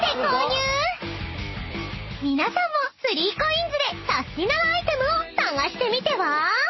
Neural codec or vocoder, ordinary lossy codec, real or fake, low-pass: none; MP3, 24 kbps; real; 7.2 kHz